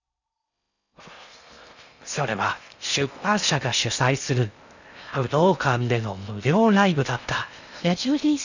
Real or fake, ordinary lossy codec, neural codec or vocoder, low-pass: fake; none; codec, 16 kHz in and 24 kHz out, 0.6 kbps, FocalCodec, streaming, 4096 codes; 7.2 kHz